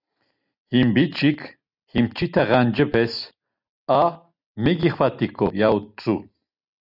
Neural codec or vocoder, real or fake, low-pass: none; real; 5.4 kHz